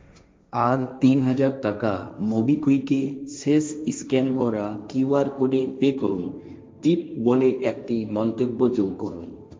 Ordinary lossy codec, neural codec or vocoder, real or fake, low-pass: none; codec, 16 kHz, 1.1 kbps, Voila-Tokenizer; fake; none